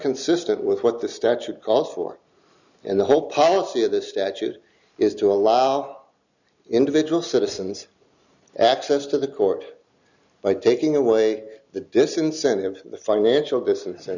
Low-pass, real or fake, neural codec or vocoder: 7.2 kHz; real; none